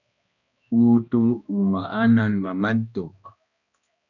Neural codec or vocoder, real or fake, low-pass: codec, 16 kHz, 1 kbps, X-Codec, HuBERT features, trained on balanced general audio; fake; 7.2 kHz